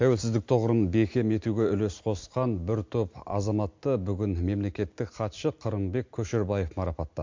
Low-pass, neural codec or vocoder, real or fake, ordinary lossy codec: 7.2 kHz; none; real; MP3, 48 kbps